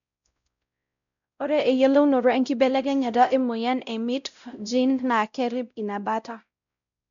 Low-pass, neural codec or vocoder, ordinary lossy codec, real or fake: 7.2 kHz; codec, 16 kHz, 0.5 kbps, X-Codec, WavLM features, trained on Multilingual LibriSpeech; none; fake